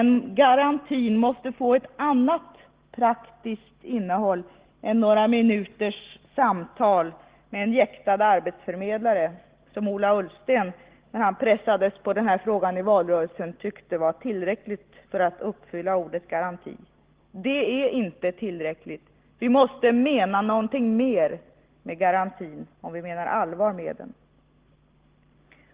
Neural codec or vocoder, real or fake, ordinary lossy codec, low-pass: none; real; Opus, 16 kbps; 3.6 kHz